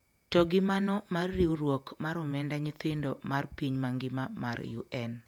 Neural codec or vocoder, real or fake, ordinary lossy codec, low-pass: vocoder, 48 kHz, 128 mel bands, Vocos; fake; none; 19.8 kHz